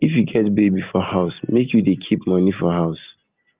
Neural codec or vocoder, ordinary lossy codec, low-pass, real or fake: none; Opus, 32 kbps; 3.6 kHz; real